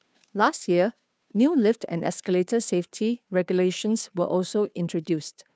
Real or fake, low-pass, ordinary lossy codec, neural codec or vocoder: fake; none; none; codec, 16 kHz, 2 kbps, FunCodec, trained on Chinese and English, 25 frames a second